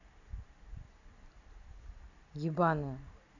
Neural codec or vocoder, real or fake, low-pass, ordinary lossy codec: vocoder, 44.1 kHz, 128 mel bands every 256 samples, BigVGAN v2; fake; 7.2 kHz; none